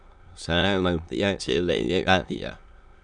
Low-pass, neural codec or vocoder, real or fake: 9.9 kHz; autoencoder, 22.05 kHz, a latent of 192 numbers a frame, VITS, trained on many speakers; fake